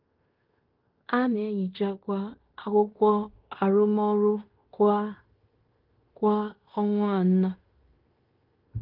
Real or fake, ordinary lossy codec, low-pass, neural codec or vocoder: fake; Opus, 32 kbps; 5.4 kHz; codec, 16 kHz in and 24 kHz out, 0.9 kbps, LongCat-Audio-Codec, fine tuned four codebook decoder